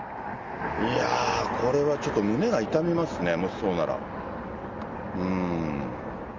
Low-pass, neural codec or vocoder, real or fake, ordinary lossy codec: 7.2 kHz; none; real; Opus, 32 kbps